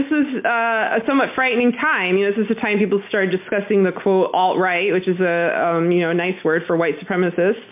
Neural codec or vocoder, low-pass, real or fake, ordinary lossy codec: none; 3.6 kHz; real; MP3, 32 kbps